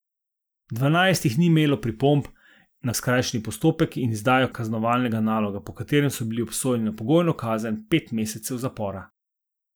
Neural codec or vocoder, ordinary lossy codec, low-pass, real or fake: none; none; none; real